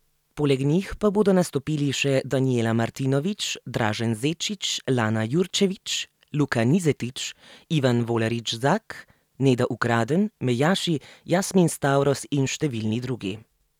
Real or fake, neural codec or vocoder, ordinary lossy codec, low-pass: real; none; none; 19.8 kHz